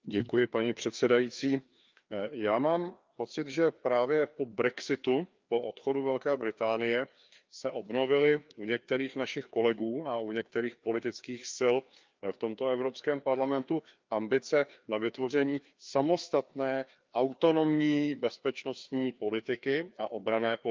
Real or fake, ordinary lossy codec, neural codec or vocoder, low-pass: fake; Opus, 24 kbps; codec, 16 kHz, 2 kbps, FreqCodec, larger model; 7.2 kHz